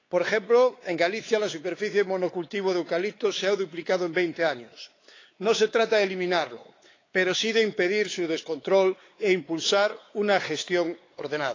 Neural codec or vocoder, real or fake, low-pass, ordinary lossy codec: codec, 16 kHz, 4 kbps, X-Codec, WavLM features, trained on Multilingual LibriSpeech; fake; 7.2 kHz; AAC, 32 kbps